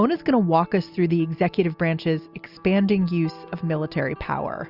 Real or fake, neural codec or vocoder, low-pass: real; none; 5.4 kHz